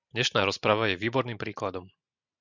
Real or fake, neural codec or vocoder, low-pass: real; none; 7.2 kHz